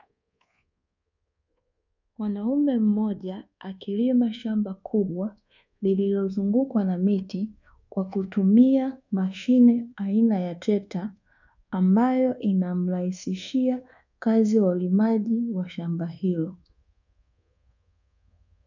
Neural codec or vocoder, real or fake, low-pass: codec, 24 kHz, 1.2 kbps, DualCodec; fake; 7.2 kHz